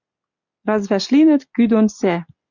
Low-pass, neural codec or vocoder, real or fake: 7.2 kHz; none; real